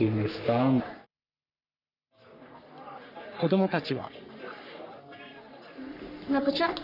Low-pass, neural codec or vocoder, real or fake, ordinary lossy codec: 5.4 kHz; codec, 44.1 kHz, 3.4 kbps, Pupu-Codec; fake; none